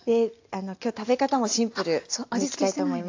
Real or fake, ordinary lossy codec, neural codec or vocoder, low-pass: real; AAC, 48 kbps; none; 7.2 kHz